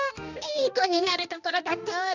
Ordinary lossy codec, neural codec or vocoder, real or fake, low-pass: none; codec, 16 kHz, 1 kbps, X-Codec, HuBERT features, trained on balanced general audio; fake; 7.2 kHz